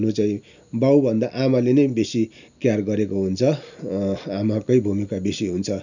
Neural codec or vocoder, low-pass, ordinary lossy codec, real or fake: none; 7.2 kHz; none; real